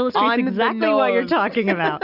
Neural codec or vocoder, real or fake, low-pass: none; real; 5.4 kHz